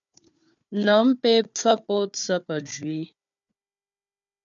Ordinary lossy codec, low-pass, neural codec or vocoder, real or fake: MP3, 96 kbps; 7.2 kHz; codec, 16 kHz, 4 kbps, FunCodec, trained on Chinese and English, 50 frames a second; fake